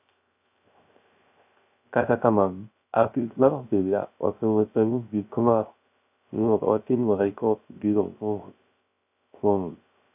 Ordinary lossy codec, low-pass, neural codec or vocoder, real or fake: none; 3.6 kHz; codec, 16 kHz, 0.3 kbps, FocalCodec; fake